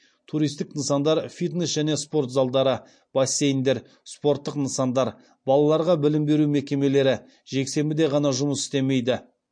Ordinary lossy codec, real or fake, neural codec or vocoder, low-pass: MP3, 48 kbps; real; none; 9.9 kHz